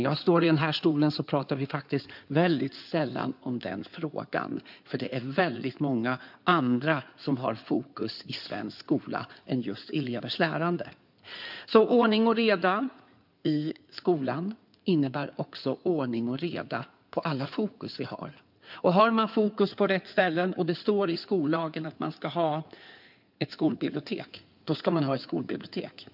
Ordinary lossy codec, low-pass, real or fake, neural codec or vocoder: none; 5.4 kHz; fake; codec, 16 kHz in and 24 kHz out, 2.2 kbps, FireRedTTS-2 codec